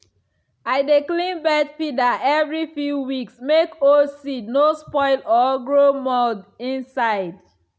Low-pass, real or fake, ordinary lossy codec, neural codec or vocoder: none; real; none; none